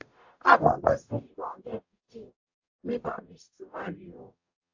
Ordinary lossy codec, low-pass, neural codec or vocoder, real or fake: AAC, 48 kbps; 7.2 kHz; codec, 44.1 kHz, 0.9 kbps, DAC; fake